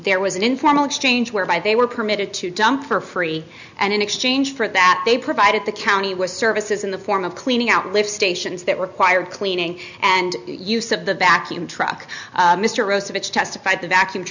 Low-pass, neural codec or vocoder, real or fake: 7.2 kHz; none; real